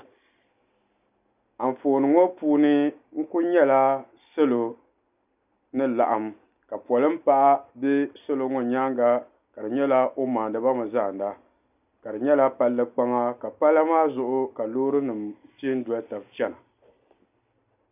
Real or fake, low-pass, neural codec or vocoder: real; 3.6 kHz; none